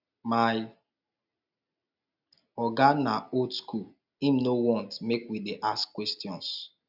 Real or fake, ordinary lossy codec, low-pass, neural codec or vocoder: real; none; 5.4 kHz; none